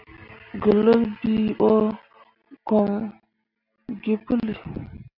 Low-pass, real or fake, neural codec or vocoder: 5.4 kHz; real; none